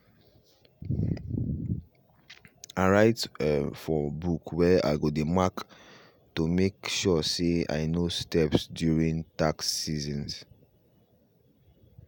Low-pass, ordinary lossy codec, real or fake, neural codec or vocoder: none; none; real; none